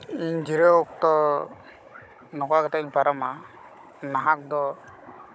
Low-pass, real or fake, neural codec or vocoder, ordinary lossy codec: none; fake; codec, 16 kHz, 16 kbps, FunCodec, trained on Chinese and English, 50 frames a second; none